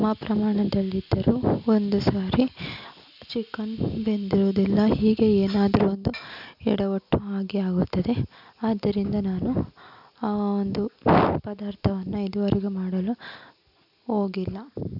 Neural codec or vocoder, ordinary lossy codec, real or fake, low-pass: none; none; real; 5.4 kHz